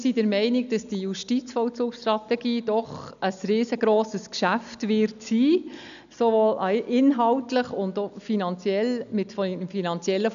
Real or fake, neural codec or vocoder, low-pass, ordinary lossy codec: real; none; 7.2 kHz; none